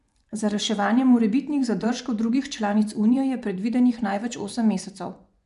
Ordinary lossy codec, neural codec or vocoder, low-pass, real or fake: none; vocoder, 24 kHz, 100 mel bands, Vocos; 10.8 kHz; fake